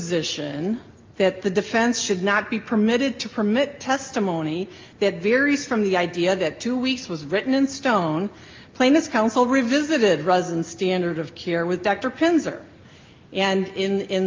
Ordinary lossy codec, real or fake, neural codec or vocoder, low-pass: Opus, 24 kbps; real; none; 7.2 kHz